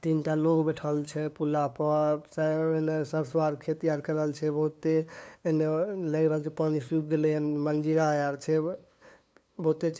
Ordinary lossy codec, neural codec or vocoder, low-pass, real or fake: none; codec, 16 kHz, 2 kbps, FunCodec, trained on LibriTTS, 25 frames a second; none; fake